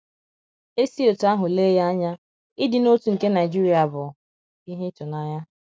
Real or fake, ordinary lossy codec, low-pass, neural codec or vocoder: real; none; none; none